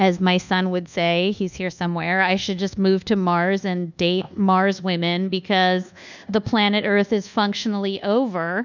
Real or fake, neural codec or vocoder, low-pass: fake; codec, 24 kHz, 1.2 kbps, DualCodec; 7.2 kHz